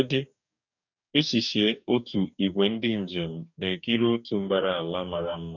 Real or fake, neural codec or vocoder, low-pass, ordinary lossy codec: fake; codec, 44.1 kHz, 2.6 kbps, DAC; 7.2 kHz; none